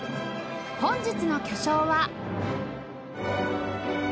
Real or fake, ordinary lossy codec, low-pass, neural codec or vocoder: real; none; none; none